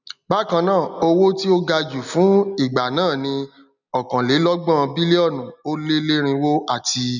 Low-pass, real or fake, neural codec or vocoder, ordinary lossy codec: 7.2 kHz; real; none; none